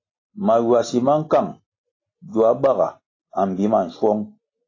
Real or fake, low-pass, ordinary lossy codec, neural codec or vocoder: real; 7.2 kHz; AAC, 32 kbps; none